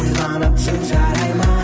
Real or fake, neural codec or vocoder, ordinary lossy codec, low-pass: real; none; none; none